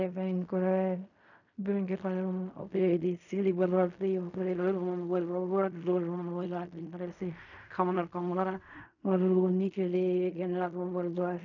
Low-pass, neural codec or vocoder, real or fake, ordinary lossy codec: 7.2 kHz; codec, 16 kHz in and 24 kHz out, 0.4 kbps, LongCat-Audio-Codec, fine tuned four codebook decoder; fake; none